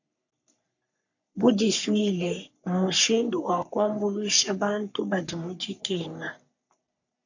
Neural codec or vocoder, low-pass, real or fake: codec, 44.1 kHz, 3.4 kbps, Pupu-Codec; 7.2 kHz; fake